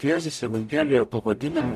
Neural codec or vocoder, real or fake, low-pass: codec, 44.1 kHz, 0.9 kbps, DAC; fake; 14.4 kHz